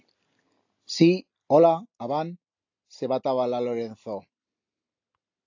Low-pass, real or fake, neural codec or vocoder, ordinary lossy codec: 7.2 kHz; real; none; AAC, 48 kbps